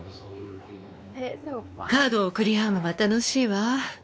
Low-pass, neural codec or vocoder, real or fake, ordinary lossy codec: none; codec, 16 kHz, 2 kbps, X-Codec, WavLM features, trained on Multilingual LibriSpeech; fake; none